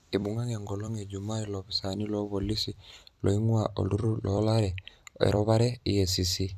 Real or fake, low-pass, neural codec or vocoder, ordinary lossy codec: fake; 14.4 kHz; vocoder, 44.1 kHz, 128 mel bands every 256 samples, BigVGAN v2; none